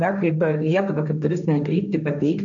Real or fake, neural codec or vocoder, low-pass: fake; codec, 16 kHz, 1.1 kbps, Voila-Tokenizer; 7.2 kHz